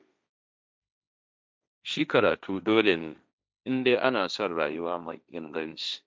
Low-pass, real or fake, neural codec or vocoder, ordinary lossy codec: none; fake; codec, 16 kHz, 1.1 kbps, Voila-Tokenizer; none